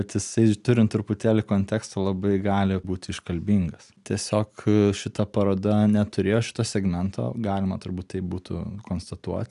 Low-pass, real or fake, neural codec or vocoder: 10.8 kHz; real; none